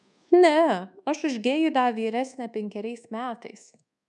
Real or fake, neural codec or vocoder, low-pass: fake; codec, 24 kHz, 1.2 kbps, DualCodec; 10.8 kHz